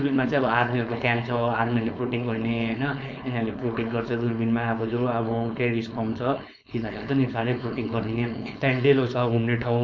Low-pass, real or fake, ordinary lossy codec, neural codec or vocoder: none; fake; none; codec, 16 kHz, 4.8 kbps, FACodec